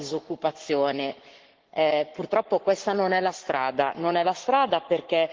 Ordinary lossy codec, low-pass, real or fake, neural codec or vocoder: Opus, 16 kbps; 7.2 kHz; fake; codec, 44.1 kHz, 7.8 kbps, Pupu-Codec